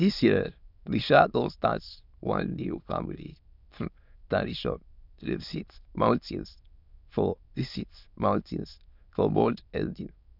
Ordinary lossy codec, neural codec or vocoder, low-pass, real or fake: none; autoencoder, 22.05 kHz, a latent of 192 numbers a frame, VITS, trained on many speakers; 5.4 kHz; fake